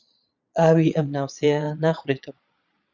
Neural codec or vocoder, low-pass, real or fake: vocoder, 22.05 kHz, 80 mel bands, Vocos; 7.2 kHz; fake